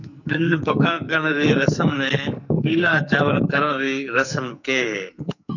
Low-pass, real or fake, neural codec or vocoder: 7.2 kHz; fake; codec, 44.1 kHz, 2.6 kbps, SNAC